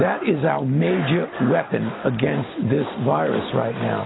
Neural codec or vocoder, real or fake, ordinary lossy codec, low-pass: none; real; AAC, 16 kbps; 7.2 kHz